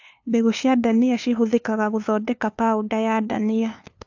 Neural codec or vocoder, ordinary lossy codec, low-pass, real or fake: codec, 16 kHz, 2 kbps, FunCodec, trained on LibriTTS, 25 frames a second; AAC, 48 kbps; 7.2 kHz; fake